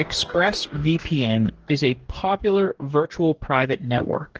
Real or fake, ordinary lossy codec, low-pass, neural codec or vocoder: fake; Opus, 16 kbps; 7.2 kHz; codec, 44.1 kHz, 2.6 kbps, DAC